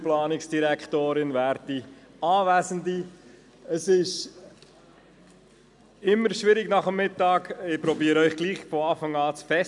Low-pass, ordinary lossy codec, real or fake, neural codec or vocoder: 10.8 kHz; none; real; none